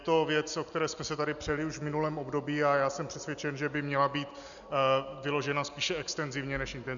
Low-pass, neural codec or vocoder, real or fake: 7.2 kHz; none; real